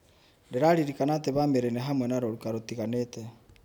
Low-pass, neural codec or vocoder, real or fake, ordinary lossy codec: none; none; real; none